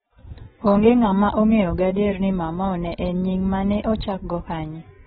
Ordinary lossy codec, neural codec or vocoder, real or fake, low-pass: AAC, 16 kbps; none; real; 19.8 kHz